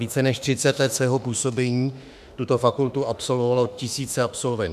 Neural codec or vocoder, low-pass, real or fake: autoencoder, 48 kHz, 32 numbers a frame, DAC-VAE, trained on Japanese speech; 14.4 kHz; fake